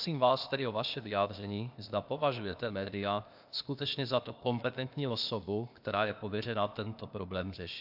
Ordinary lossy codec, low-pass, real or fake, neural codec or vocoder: AAC, 48 kbps; 5.4 kHz; fake; codec, 16 kHz, 0.8 kbps, ZipCodec